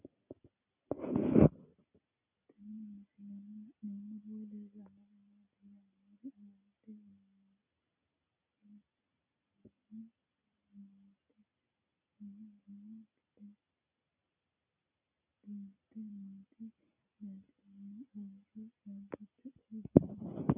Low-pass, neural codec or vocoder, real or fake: 3.6 kHz; none; real